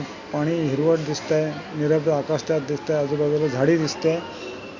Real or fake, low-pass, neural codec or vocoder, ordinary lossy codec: real; 7.2 kHz; none; Opus, 64 kbps